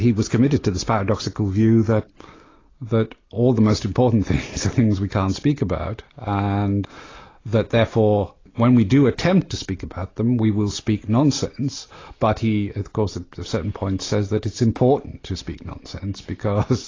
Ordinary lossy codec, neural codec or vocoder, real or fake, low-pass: AAC, 32 kbps; none; real; 7.2 kHz